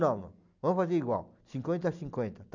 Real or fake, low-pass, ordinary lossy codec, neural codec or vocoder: real; 7.2 kHz; none; none